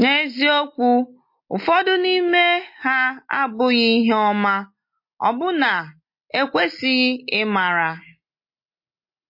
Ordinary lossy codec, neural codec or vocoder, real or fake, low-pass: MP3, 32 kbps; none; real; 5.4 kHz